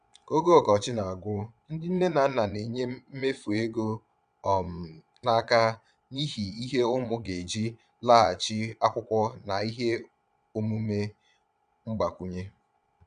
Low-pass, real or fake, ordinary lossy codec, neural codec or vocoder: 9.9 kHz; fake; none; vocoder, 22.05 kHz, 80 mel bands, Vocos